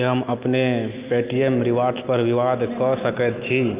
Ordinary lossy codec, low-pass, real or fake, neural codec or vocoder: Opus, 24 kbps; 3.6 kHz; real; none